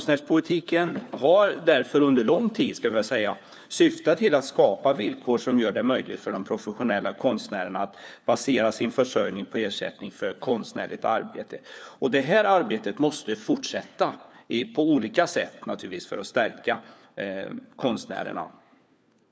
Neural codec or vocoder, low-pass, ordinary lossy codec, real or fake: codec, 16 kHz, 4 kbps, FunCodec, trained on LibriTTS, 50 frames a second; none; none; fake